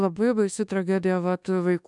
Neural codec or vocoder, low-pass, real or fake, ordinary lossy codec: codec, 24 kHz, 1.2 kbps, DualCodec; 10.8 kHz; fake; MP3, 96 kbps